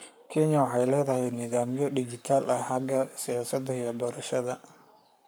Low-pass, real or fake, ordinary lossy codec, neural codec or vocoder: none; fake; none; codec, 44.1 kHz, 7.8 kbps, Pupu-Codec